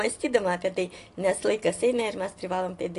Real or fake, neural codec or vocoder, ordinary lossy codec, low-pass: real; none; AAC, 48 kbps; 10.8 kHz